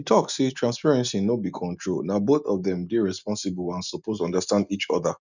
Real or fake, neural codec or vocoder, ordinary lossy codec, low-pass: real; none; none; 7.2 kHz